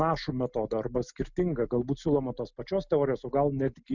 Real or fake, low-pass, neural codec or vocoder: real; 7.2 kHz; none